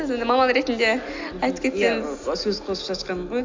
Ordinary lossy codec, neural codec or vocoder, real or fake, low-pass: MP3, 64 kbps; none; real; 7.2 kHz